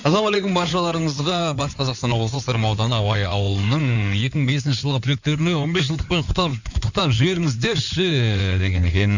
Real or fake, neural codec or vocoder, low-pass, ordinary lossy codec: fake; codec, 16 kHz in and 24 kHz out, 2.2 kbps, FireRedTTS-2 codec; 7.2 kHz; none